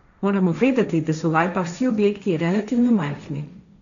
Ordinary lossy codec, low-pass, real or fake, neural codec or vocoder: none; 7.2 kHz; fake; codec, 16 kHz, 1.1 kbps, Voila-Tokenizer